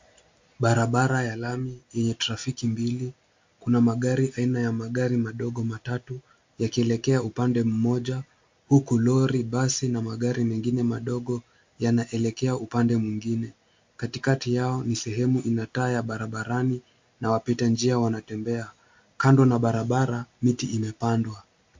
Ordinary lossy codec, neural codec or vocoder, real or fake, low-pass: MP3, 48 kbps; none; real; 7.2 kHz